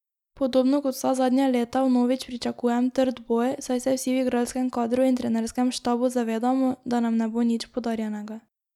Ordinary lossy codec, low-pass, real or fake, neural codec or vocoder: none; 19.8 kHz; real; none